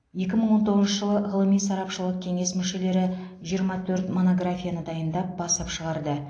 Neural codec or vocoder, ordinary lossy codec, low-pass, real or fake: none; AAC, 48 kbps; 9.9 kHz; real